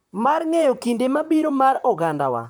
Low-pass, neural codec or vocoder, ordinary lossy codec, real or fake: none; vocoder, 44.1 kHz, 128 mel bands, Pupu-Vocoder; none; fake